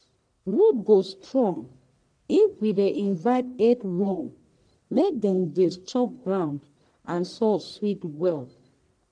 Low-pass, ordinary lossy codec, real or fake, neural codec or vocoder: 9.9 kHz; none; fake; codec, 44.1 kHz, 1.7 kbps, Pupu-Codec